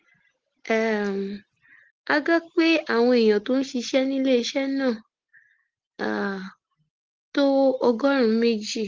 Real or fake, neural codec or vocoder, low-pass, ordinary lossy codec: real; none; 7.2 kHz; Opus, 16 kbps